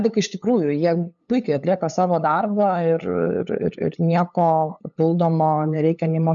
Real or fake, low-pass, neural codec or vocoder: fake; 7.2 kHz; codec, 16 kHz, 8 kbps, FunCodec, trained on LibriTTS, 25 frames a second